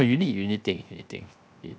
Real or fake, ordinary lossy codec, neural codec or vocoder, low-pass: fake; none; codec, 16 kHz, 0.7 kbps, FocalCodec; none